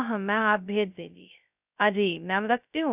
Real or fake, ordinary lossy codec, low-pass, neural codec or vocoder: fake; none; 3.6 kHz; codec, 16 kHz, 0.2 kbps, FocalCodec